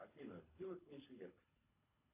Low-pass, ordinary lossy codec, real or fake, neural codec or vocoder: 3.6 kHz; AAC, 32 kbps; fake; codec, 24 kHz, 3 kbps, HILCodec